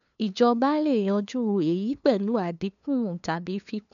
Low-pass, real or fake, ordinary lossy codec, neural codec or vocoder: 7.2 kHz; fake; none; codec, 16 kHz, 2 kbps, FunCodec, trained on LibriTTS, 25 frames a second